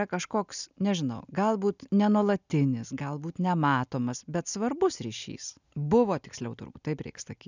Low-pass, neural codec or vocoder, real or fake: 7.2 kHz; none; real